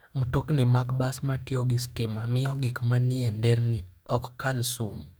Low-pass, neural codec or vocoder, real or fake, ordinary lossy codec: none; codec, 44.1 kHz, 2.6 kbps, DAC; fake; none